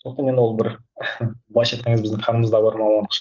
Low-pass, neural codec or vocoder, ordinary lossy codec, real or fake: 7.2 kHz; none; Opus, 24 kbps; real